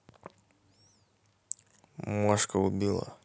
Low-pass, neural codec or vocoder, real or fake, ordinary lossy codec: none; none; real; none